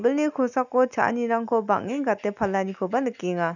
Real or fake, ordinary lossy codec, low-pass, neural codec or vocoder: real; none; 7.2 kHz; none